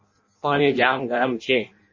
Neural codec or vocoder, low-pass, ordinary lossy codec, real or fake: codec, 16 kHz in and 24 kHz out, 0.6 kbps, FireRedTTS-2 codec; 7.2 kHz; MP3, 32 kbps; fake